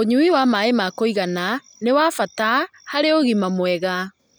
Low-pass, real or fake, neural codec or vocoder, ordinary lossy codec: none; real; none; none